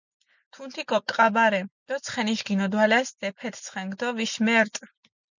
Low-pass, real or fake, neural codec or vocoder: 7.2 kHz; real; none